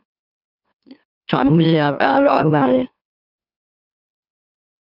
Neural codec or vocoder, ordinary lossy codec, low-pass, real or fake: autoencoder, 44.1 kHz, a latent of 192 numbers a frame, MeloTTS; none; 5.4 kHz; fake